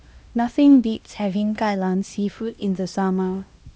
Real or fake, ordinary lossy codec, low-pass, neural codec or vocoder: fake; none; none; codec, 16 kHz, 1 kbps, X-Codec, HuBERT features, trained on LibriSpeech